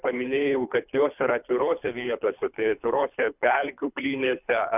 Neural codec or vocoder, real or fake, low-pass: codec, 24 kHz, 3 kbps, HILCodec; fake; 3.6 kHz